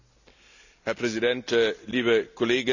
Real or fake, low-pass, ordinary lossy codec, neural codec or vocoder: real; 7.2 kHz; none; none